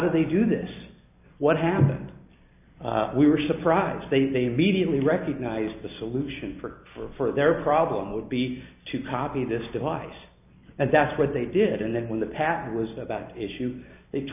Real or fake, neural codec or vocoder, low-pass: real; none; 3.6 kHz